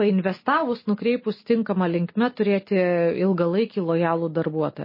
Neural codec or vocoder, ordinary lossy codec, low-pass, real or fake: none; MP3, 24 kbps; 5.4 kHz; real